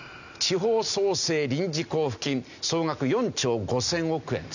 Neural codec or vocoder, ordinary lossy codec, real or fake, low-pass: none; none; real; 7.2 kHz